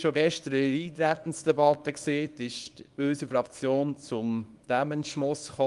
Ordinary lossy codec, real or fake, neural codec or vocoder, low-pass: Opus, 32 kbps; fake; codec, 24 kHz, 0.9 kbps, WavTokenizer, small release; 10.8 kHz